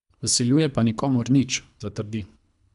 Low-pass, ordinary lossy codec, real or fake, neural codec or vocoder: 10.8 kHz; none; fake; codec, 24 kHz, 3 kbps, HILCodec